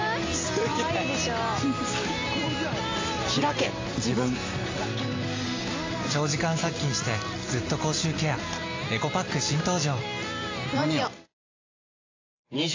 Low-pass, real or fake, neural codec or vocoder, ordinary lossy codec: 7.2 kHz; real; none; AAC, 32 kbps